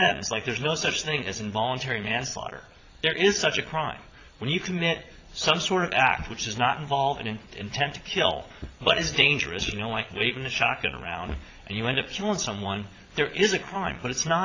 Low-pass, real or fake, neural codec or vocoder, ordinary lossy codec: 7.2 kHz; fake; vocoder, 22.05 kHz, 80 mel bands, Vocos; AAC, 32 kbps